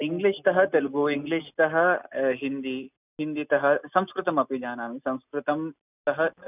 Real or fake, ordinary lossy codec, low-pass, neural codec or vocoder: real; none; 3.6 kHz; none